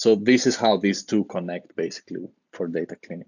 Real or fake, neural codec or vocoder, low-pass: real; none; 7.2 kHz